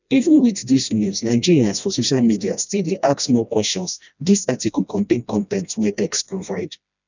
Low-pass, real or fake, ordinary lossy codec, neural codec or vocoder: 7.2 kHz; fake; none; codec, 16 kHz, 1 kbps, FreqCodec, smaller model